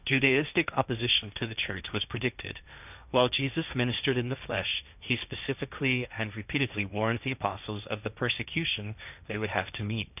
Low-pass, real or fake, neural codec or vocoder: 3.6 kHz; fake; codec, 16 kHz, 1.1 kbps, Voila-Tokenizer